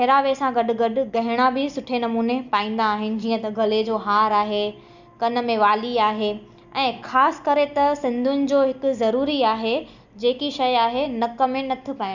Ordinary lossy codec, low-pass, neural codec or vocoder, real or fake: none; 7.2 kHz; none; real